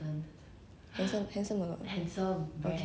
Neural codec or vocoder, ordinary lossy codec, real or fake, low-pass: none; none; real; none